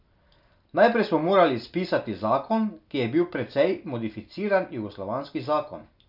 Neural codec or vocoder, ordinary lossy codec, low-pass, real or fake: none; none; 5.4 kHz; real